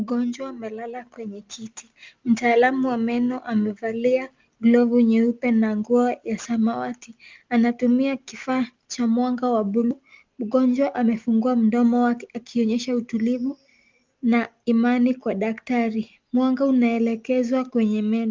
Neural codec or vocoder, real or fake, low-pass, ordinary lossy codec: none; real; 7.2 kHz; Opus, 32 kbps